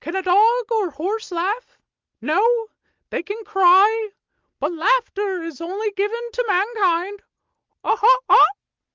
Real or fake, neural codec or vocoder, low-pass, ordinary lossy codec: real; none; 7.2 kHz; Opus, 24 kbps